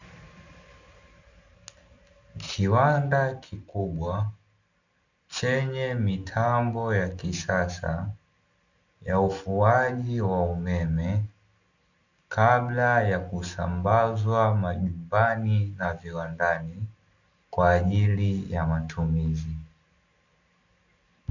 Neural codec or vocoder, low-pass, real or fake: none; 7.2 kHz; real